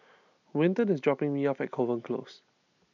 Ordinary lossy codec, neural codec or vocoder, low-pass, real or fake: none; none; 7.2 kHz; real